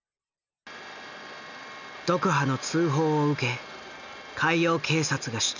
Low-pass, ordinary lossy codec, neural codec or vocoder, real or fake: 7.2 kHz; none; none; real